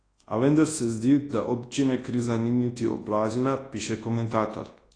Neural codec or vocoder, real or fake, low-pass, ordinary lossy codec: codec, 24 kHz, 0.9 kbps, WavTokenizer, large speech release; fake; 9.9 kHz; AAC, 32 kbps